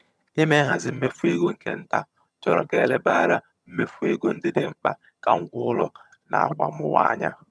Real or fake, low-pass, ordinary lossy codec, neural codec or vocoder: fake; none; none; vocoder, 22.05 kHz, 80 mel bands, HiFi-GAN